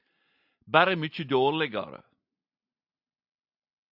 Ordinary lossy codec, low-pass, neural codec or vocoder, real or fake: AAC, 48 kbps; 5.4 kHz; none; real